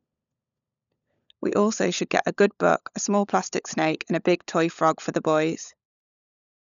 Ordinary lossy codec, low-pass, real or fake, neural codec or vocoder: none; 7.2 kHz; fake; codec, 16 kHz, 16 kbps, FunCodec, trained on LibriTTS, 50 frames a second